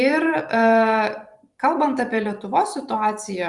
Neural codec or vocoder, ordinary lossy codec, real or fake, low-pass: vocoder, 44.1 kHz, 128 mel bands every 256 samples, BigVGAN v2; Opus, 64 kbps; fake; 10.8 kHz